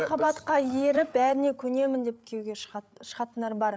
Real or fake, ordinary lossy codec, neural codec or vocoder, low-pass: fake; none; codec, 16 kHz, 8 kbps, FreqCodec, larger model; none